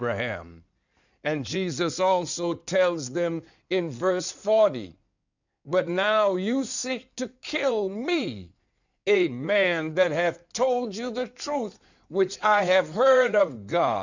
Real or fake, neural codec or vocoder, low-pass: fake; codec, 16 kHz in and 24 kHz out, 2.2 kbps, FireRedTTS-2 codec; 7.2 kHz